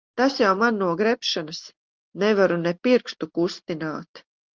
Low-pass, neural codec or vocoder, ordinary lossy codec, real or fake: 7.2 kHz; none; Opus, 24 kbps; real